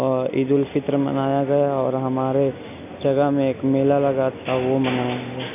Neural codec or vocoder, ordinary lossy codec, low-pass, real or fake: none; AAC, 32 kbps; 3.6 kHz; real